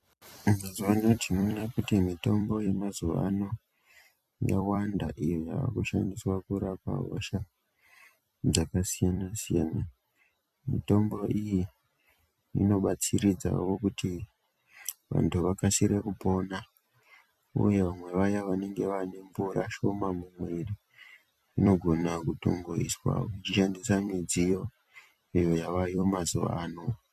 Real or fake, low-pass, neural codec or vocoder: fake; 14.4 kHz; vocoder, 44.1 kHz, 128 mel bands every 256 samples, BigVGAN v2